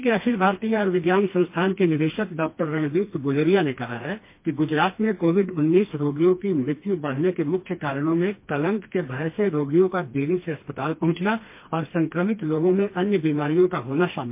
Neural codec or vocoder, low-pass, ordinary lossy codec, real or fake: codec, 16 kHz, 2 kbps, FreqCodec, smaller model; 3.6 kHz; MP3, 24 kbps; fake